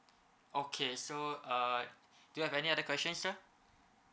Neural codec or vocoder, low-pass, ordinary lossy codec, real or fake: none; none; none; real